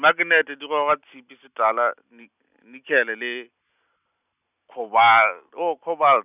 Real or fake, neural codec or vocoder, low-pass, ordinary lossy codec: real; none; 3.6 kHz; none